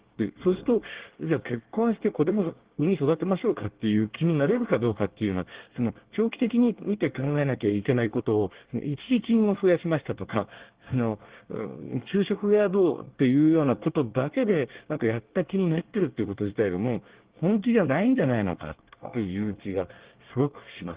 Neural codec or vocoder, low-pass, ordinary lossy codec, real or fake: codec, 24 kHz, 1 kbps, SNAC; 3.6 kHz; Opus, 16 kbps; fake